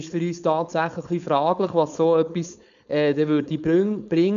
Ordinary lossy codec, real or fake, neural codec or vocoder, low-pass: none; fake; codec, 16 kHz, 4.8 kbps, FACodec; 7.2 kHz